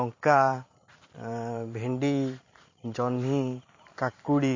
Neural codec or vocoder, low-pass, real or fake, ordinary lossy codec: vocoder, 44.1 kHz, 128 mel bands every 512 samples, BigVGAN v2; 7.2 kHz; fake; MP3, 32 kbps